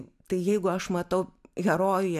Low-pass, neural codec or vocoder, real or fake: 14.4 kHz; none; real